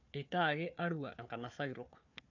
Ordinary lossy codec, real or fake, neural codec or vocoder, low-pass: none; fake; codec, 16 kHz, 4 kbps, FunCodec, trained on Chinese and English, 50 frames a second; 7.2 kHz